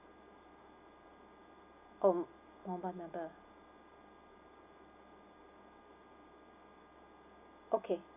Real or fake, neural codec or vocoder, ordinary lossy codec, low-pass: real; none; none; 3.6 kHz